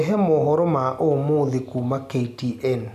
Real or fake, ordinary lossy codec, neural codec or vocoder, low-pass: real; none; none; 14.4 kHz